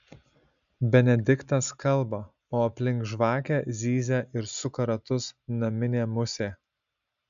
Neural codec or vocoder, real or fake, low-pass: none; real; 7.2 kHz